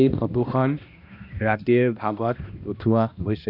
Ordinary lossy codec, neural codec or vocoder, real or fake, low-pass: none; codec, 16 kHz, 1 kbps, X-Codec, HuBERT features, trained on balanced general audio; fake; 5.4 kHz